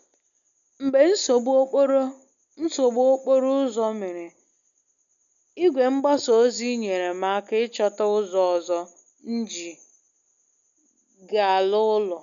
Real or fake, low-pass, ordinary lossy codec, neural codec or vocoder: real; 7.2 kHz; none; none